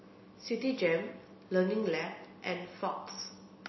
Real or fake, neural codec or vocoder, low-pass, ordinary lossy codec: real; none; 7.2 kHz; MP3, 24 kbps